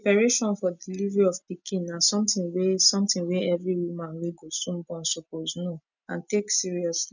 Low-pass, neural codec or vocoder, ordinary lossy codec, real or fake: 7.2 kHz; none; none; real